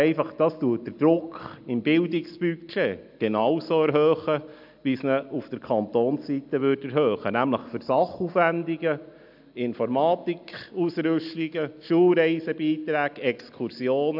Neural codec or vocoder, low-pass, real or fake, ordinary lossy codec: none; 5.4 kHz; real; AAC, 48 kbps